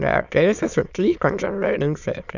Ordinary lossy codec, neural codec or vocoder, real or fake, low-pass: none; autoencoder, 22.05 kHz, a latent of 192 numbers a frame, VITS, trained on many speakers; fake; 7.2 kHz